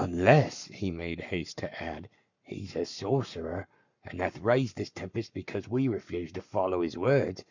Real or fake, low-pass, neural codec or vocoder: fake; 7.2 kHz; codec, 44.1 kHz, 7.8 kbps, Pupu-Codec